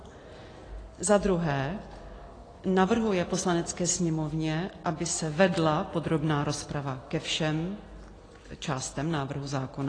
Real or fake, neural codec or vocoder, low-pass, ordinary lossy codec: real; none; 9.9 kHz; AAC, 32 kbps